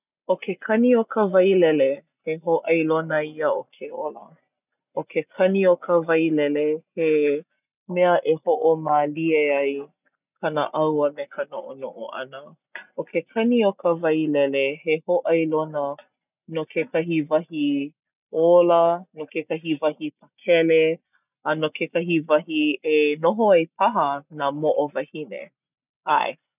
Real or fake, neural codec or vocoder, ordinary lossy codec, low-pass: real; none; none; 3.6 kHz